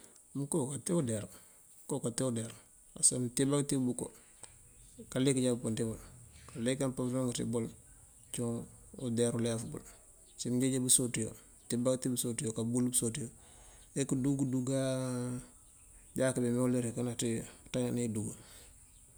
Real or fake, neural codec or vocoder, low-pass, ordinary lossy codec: real; none; none; none